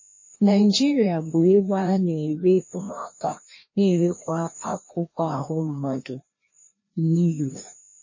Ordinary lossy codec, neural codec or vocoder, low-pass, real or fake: MP3, 32 kbps; codec, 16 kHz, 1 kbps, FreqCodec, larger model; 7.2 kHz; fake